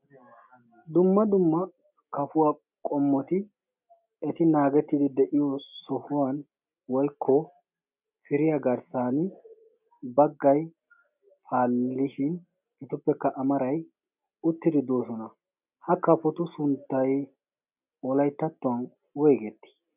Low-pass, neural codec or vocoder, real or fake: 3.6 kHz; none; real